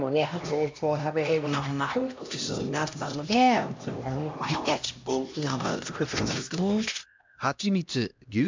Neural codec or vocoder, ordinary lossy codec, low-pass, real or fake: codec, 16 kHz, 1 kbps, X-Codec, HuBERT features, trained on LibriSpeech; MP3, 64 kbps; 7.2 kHz; fake